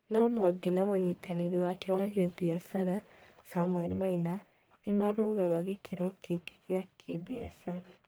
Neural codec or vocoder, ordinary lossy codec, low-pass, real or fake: codec, 44.1 kHz, 1.7 kbps, Pupu-Codec; none; none; fake